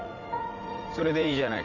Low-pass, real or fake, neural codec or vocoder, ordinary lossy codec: 7.2 kHz; fake; vocoder, 44.1 kHz, 80 mel bands, Vocos; none